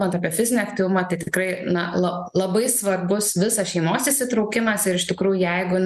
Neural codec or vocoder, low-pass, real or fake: none; 14.4 kHz; real